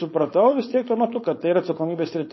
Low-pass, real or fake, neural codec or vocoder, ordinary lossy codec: 7.2 kHz; fake; codec, 16 kHz, 4.8 kbps, FACodec; MP3, 24 kbps